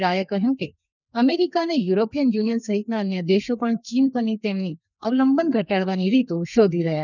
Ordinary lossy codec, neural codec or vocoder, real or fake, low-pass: none; codec, 32 kHz, 1.9 kbps, SNAC; fake; 7.2 kHz